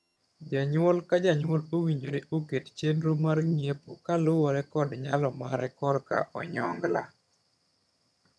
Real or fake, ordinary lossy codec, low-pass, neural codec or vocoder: fake; none; none; vocoder, 22.05 kHz, 80 mel bands, HiFi-GAN